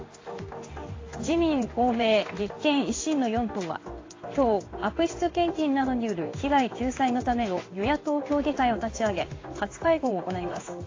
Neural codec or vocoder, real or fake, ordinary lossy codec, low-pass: codec, 16 kHz in and 24 kHz out, 1 kbps, XY-Tokenizer; fake; AAC, 32 kbps; 7.2 kHz